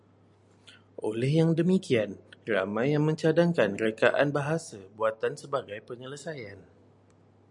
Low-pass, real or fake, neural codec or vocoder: 10.8 kHz; real; none